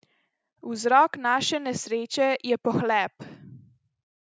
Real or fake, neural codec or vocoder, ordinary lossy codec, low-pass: real; none; none; none